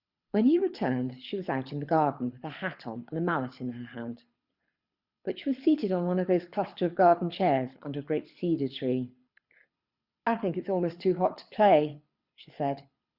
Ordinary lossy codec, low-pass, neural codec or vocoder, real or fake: Opus, 64 kbps; 5.4 kHz; codec, 24 kHz, 6 kbps, HILCodec; fake